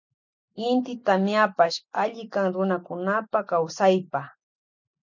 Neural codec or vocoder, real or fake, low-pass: none; real; 7.2 kHz